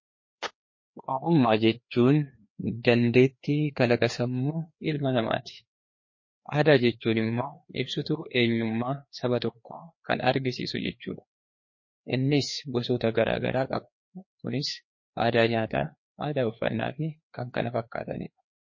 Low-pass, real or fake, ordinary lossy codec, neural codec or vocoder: 7.2 kHz; fake; MP3, 32 kbps; codec, 16 kHz, 2 kbps, FreqCodec, larger model